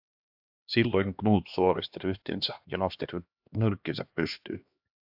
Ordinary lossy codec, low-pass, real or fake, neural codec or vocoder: AAC, 48 kbps; 5.4 kHz; fake; codec, 16 kHz, 2 kbps, X-Codec, HuBERT features, trained on LibriSpeech